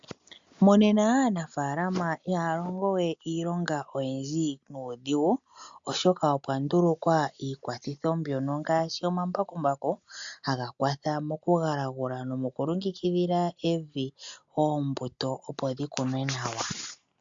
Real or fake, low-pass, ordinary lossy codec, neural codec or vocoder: real; 7.2 kHz; MP3, 64 kbps; none